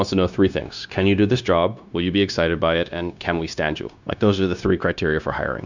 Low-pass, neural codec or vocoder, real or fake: 7.2 kHz; codec, 16 kHz, 0.9 kbps, LongCat-Audio-Codec; fake